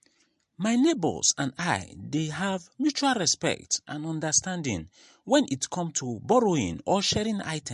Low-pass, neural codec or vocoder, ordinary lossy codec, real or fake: 14.4 kHz; none; MP3, 48 kbps; real